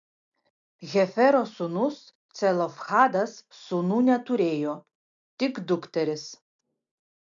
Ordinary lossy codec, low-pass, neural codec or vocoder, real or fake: MP3, 64 kbps; 7.2 kHz; none; real